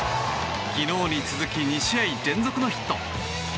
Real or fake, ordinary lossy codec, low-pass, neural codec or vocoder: real; none; none; none